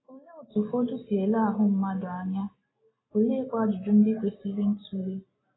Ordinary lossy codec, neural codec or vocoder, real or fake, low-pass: AAC, 16 kbps; none; real; 7.2 kHz